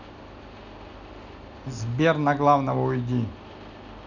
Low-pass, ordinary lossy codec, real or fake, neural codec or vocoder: 7.2 kHz; none; real; none